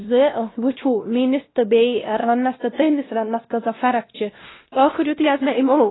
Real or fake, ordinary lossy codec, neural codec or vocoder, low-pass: fake; AAC, 16 kbps; codec, 16 kHz, 1 kbps, X-Codec, WavLM features, trained on Multilingual LibriSpeech; 7.2 kHz